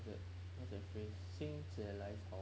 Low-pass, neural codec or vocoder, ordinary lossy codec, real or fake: none; none; none; real